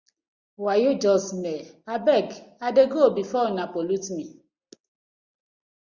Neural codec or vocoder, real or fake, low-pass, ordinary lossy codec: none; real; 7.2 kHz; Opus, 64 kbps